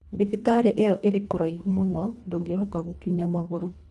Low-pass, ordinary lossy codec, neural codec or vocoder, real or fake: none; none; codec, 24 kHz, 1.5 kbps, HILCodec; fake